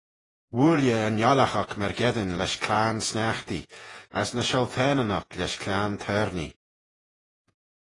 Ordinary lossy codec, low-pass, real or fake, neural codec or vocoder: AAC, 32 kbps; 10.8 kHz; fake; vocoder, 48 kHz, 128 mel bands, Vocos